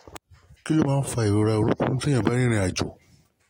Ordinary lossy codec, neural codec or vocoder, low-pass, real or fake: AAC, 48 kbps; none; 19.8 kHz; real